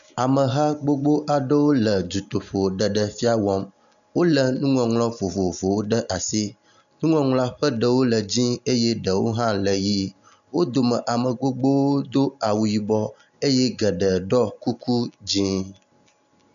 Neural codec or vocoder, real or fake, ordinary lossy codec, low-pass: none; real; MP3, 96 kbps; 7.2 kHz